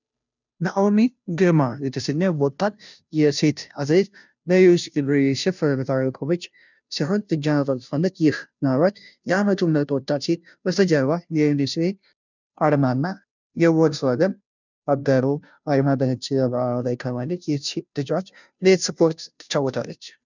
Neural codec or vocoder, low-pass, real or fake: codec, 16 kHz, 0.5 kbps, FunCodec, trained on Chinese and English, 25 frames a second; 7.2 kHz; fake